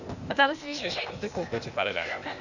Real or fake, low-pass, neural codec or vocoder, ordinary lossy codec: fake; 7.2 kHz; codec, 16 kHz, 0.8 kbps, ZipCodec; none